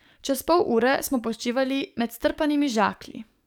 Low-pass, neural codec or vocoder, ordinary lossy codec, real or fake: 19.8 kHz; codec, 44.1 kHz, 7.8 kbps, DAC; none; fake